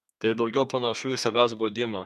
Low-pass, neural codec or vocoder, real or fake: 14.4 kHz; codec, 32 kHz, 1.9 kbps, SNAC; fake